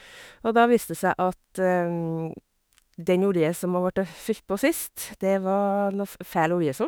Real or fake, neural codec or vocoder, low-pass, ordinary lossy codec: fake; autoencoder, 48 kHz, 32 numbers a frame, DAC-VAE, trained on Japanese speech; none; none